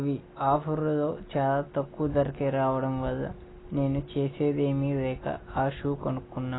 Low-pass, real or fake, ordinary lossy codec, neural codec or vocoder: 7.2 kHz; real; AAC, 16 kbps; none